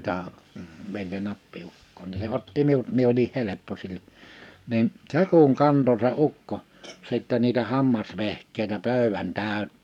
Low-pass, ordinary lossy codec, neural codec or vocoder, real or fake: 19.8 kHz; none; codec, 44.1 kHz, 7.8 kbps, DAC; fake